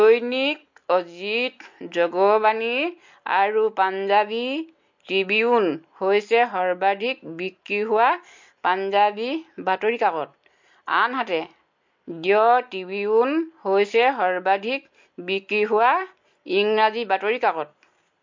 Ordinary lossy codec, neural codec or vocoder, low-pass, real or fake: MP3, 48 kbps; none; 7.2 kHz; real